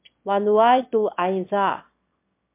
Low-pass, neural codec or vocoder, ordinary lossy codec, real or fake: 3.6 kHz; autoencoder, 22.05 kHz, a latent of 192 numbers a frame, VITS, trained on one speaker; MP3, 32 kbps; fake